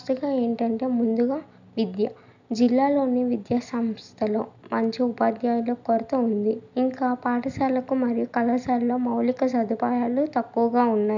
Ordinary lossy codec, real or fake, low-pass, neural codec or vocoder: none; real; 7.2 kHz; none